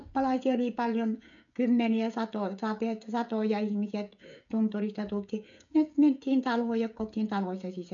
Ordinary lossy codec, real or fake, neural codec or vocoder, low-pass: none; fake; codec, 16 kHz, 16 kbps, FreqCodec, smaller model; 7.2 kHz